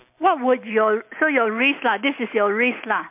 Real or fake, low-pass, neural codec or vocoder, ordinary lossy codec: real; 3.6 kHz; none; MP3, 32 kbps